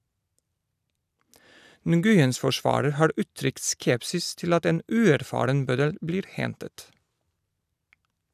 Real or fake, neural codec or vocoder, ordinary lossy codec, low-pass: real; none; none; 14.4 kHz